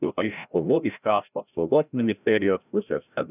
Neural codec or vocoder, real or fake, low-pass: codec, 16 kHz, 0.5 kbps, FreqCodec, larger model; fake; 3.6 kHz